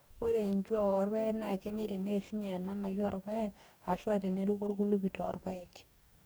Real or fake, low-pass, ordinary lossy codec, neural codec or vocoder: fake; none; none; codec, 44.1 kHz, 2.6 kbps, DAC